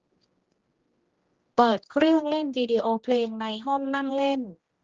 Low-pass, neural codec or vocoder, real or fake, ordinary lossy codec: 7.2 kHz; codec, 16 kHz, 1 kbps, X-Codec, HuBERT features, trained on general audio; fake; Opus, 16 kbps